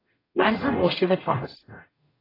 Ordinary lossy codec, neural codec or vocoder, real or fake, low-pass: AAC, 24 kbps; codec, 44.1 kHz, 0.9 kbps, DAC; fake; 5.4 kHz